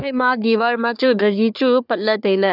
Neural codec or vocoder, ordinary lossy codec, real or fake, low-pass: codec, 44.1 kHz, 3.4 kbps, Pupu-Codec; none; fake; 5.4 kHz